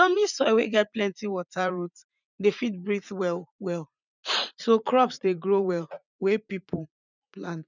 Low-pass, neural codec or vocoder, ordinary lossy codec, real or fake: 7.2 kHz; vocoder, 44.1 kHz, 80 mel bands, Vocos; none; fake